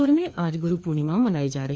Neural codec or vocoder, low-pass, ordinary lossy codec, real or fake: codec, 16 kHz, 2 kbps, FreqCodec, larger model; none; none; fake